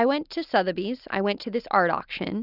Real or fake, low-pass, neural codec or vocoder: real; 5.4 kHz; none